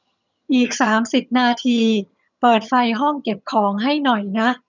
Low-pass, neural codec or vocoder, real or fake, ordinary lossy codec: 7.2 kHz; vocoder, 22.05 kHz, 80 mel bands, HiFi-GAN; fake; none